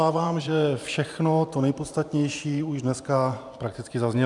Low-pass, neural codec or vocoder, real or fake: 10.8 kHz; vocoder, 44.1 kHz, 128 mel bands every 512 samples, BigVGAN v2; fake